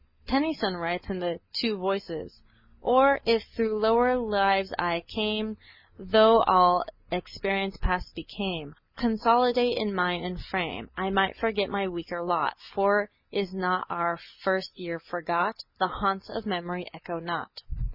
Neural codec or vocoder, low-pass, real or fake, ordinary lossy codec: none; 5.4 kHz; real; MP3, 48 kbps